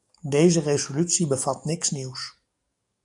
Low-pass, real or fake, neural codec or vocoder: 10.8 kHz; fake; codec, 44.1 kHz, 7.8 kbps, DAC